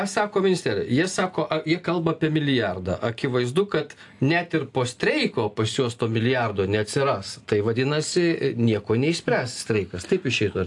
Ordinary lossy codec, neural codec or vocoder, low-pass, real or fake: AAC, 64 kbps; none; 10.8 kHz; real